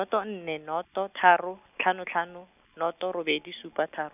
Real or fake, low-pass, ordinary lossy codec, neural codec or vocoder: real; 3.6 kHz; none; none